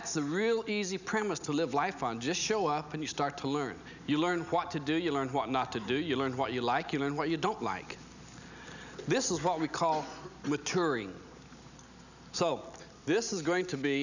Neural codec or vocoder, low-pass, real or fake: none; 7.2 kHz; real